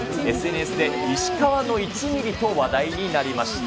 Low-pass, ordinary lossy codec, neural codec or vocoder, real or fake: none; none; none; real